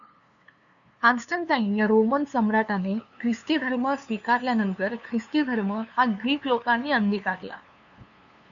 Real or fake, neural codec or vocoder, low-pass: fake; codec, 16 kHz, 2 kbps, FunCodec, trained on LibriTTS, 25 frames a second; 7.2 kHz